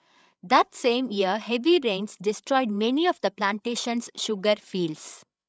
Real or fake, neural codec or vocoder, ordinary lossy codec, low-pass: fake; codec, 16 kHz, 8 kbps, FreqCodec, larger model; none; none